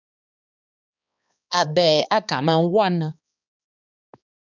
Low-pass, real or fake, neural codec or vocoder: 7.2 kHz; fake; codec, 16 kHz, 2 kbps, X-Codec, HuBERT features, trained on balanced general audio